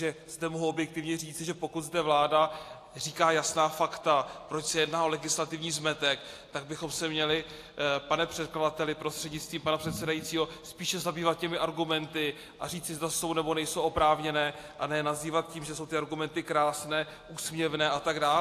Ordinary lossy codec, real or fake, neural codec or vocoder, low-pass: AAC, 64 kbps; real; none; 14.4 kHz